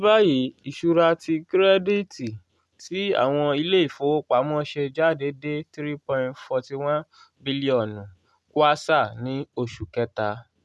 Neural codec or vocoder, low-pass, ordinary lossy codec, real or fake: none; none; none; real